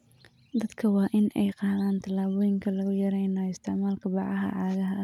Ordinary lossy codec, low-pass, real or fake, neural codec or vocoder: none; 19.8 kHz; real; none